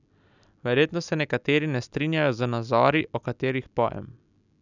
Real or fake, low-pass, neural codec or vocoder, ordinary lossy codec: real; 7.2 kHz; none; none